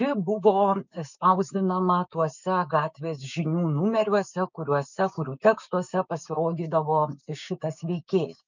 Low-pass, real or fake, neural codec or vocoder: 7.2 kHz; fake; codec, 16 kHz, 6 kbps, DAC